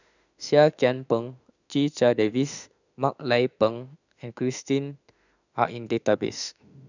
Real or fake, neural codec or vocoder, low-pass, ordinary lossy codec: fake; autoencoder, 48 kHz, 32 numbers a frame, DAC-VAE, trained on Japanese speech; 7.2 kHz; none